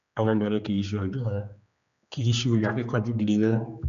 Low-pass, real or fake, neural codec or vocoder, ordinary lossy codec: 7.2 kHz; fake; codec, 16 kHz, 2 kbps, X-Codec, HuBERT features, trained on general audio; none